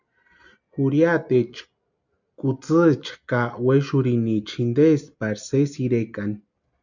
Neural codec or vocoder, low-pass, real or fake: none; 7.2 kHz; real